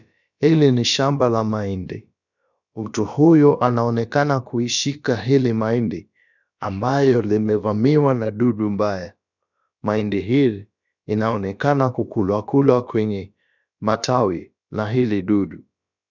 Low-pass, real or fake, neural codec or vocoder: 7.2 kHz; fake; codec, 16 kHz, about 1 kbps, DyCAST, with the encoder's durations